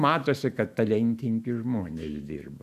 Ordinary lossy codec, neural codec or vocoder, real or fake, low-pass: AAC, 96 kbps; autoencoder, 48 kHz, 128 numbers a frame, DAC-VAE, trained on Japanese speech; fake; 14.4 kHz